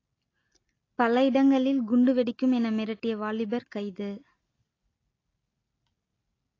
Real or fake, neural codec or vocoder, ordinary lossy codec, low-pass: real; none; AAC, 32 kbps; 7.2 kHz